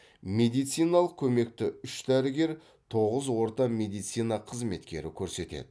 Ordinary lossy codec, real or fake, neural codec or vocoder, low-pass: none; real; none; none